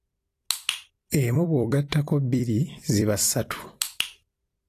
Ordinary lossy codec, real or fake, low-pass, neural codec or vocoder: AAC, 48 kbps; fake; 14.4 kHz; vocoder, 44.1 kHz, 128 mel bands every 256 samples, BigVGAN v2